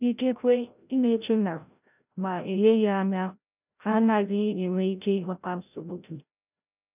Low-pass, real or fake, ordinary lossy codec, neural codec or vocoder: 3.6 kHz; fake; none; codec, 16 kHz, 0.5 kbps, FreqCodec, larger model